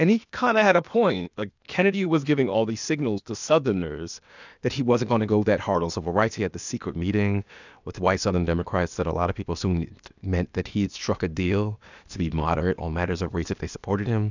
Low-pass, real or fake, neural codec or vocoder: 7.2 kHz; fake; codec, 16 kHz, 0.8 kbps, ZipCodec